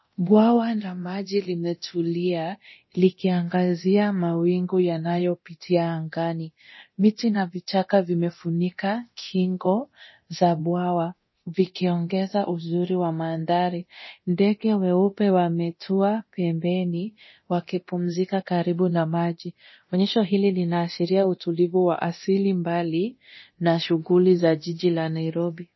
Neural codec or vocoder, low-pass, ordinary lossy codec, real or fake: codec, 24 kHz, 0.9 kbps, DualCodec; 7.2 kHz; MP3, 24 kbps; fake